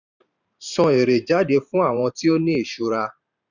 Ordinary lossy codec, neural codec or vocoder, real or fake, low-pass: none; vocoder, 44.1 kHz, 128 mel bands every 512 samples, BigVGAN v2; fake; 7.2 kHz